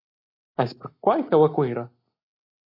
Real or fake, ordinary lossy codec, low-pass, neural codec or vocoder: real; MP3, 32 kbps; 5.4 kHz; none